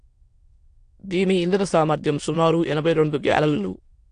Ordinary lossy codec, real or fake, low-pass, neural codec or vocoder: AAC, 48 kbps; fake; 9.9 kHz; autoencoder, 22.05 kHz, a latent of 192 numbers a frame, VITS, trained on many speakers